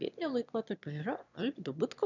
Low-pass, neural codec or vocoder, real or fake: 7.2 kHz; autoencoder, 22.05 kHz, a latent of 192 numbers a frame, VITS, trained on one speaker; fake